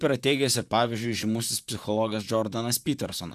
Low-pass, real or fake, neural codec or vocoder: 14.4 kHz; fake; vocoder, 44.1 kHz, 128 mel bands, Pupu-Vocoder